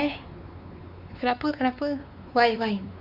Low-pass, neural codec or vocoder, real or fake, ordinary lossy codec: 5.4 kHz; codec, 16 kHz, 4 kbps, X-Codec, WavLM features, trained on Multilingual LibriSpeech; fake; none